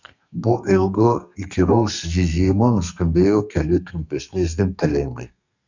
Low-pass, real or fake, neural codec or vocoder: 7.2 kHz; fake; codec, 32 kHz, 1.9 kbps, SNAC